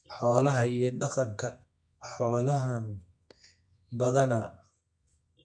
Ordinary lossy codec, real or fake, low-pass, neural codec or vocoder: MP3, 64 kbps; fake; 9.9 kHz; codec, 24 kHz, 0.9 kbps, WavTokenizer, medium music audio release